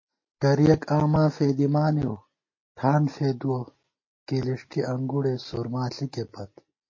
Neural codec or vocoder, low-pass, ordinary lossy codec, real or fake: none; 7.2 kHz; MP3, 32 kbps; real